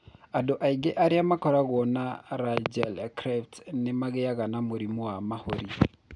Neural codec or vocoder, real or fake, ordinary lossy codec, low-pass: none; real; none; 10.8 kHz